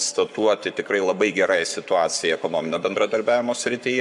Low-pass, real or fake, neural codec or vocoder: 10.8 kHz; fake; codec, 44.1 kHz, 7.8 kbps, Pupu-Codec